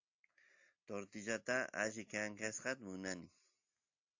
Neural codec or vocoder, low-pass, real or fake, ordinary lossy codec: none; 7.2 kHz; real; AAC, 48 kbps